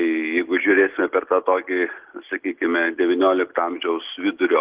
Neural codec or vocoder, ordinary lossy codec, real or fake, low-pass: none; Opus, 16 kbps; real; 3.6 kHz